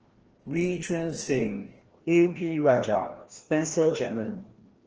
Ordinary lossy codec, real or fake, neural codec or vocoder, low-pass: Opus, 16 kbps; fake; codec, 16 kHz, 1 kbps, FreqCodec, larger model; 7.2 kHz